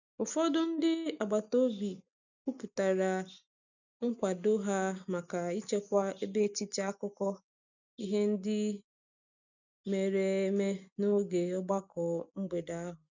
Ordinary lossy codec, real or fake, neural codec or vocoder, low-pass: none; real; none; 7.2 kHz